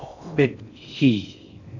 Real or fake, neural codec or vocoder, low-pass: fake; codec, 16 kHz in and 24 kHz out, 0.6 kbps, FocalCodec, streaming, 4096 codes; 7.2 kHz